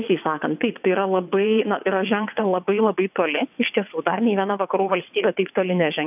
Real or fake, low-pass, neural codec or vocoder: fake; 3.6 kHz; vocoder, 22.05 kHz, 80 mel bands, WaveNeXt